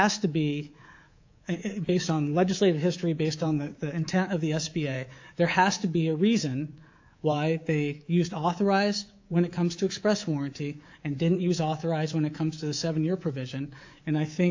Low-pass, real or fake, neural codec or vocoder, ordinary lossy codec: 7.2 kHz; fake; codec, 24 kHz, 3.1 kbps, DualCodec; AAC, 48 kbps